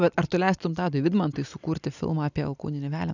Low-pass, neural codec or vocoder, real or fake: 7.2 kHz; none; real